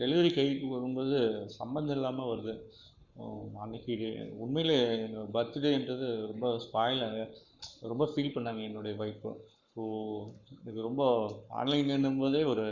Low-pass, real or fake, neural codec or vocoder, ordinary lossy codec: 7.2 kHz; fake; codec, 44.1 kHz, 7.8 kbps, Pupu-Codec; none